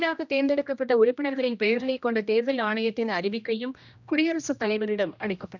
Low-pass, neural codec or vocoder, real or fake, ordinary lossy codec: 7.2 kHz; codec, 16 kHz, 1 kbps, X-Codec, HuBERT features, trained on general audio; fake; none